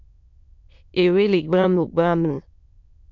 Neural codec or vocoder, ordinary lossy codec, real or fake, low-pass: autoencoder, 22.05 kHz, a latent of 192 numbers a frame, VITS, trained on many speakers; MP3, 64 kbps; fake; 7.2 kHz